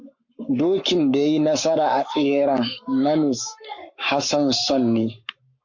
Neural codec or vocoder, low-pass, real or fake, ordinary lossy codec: codec, 44.1 kHz, 7.8 kbps, Pupu-Codec; 7.2 kHz; fake; MP3, 48 kbps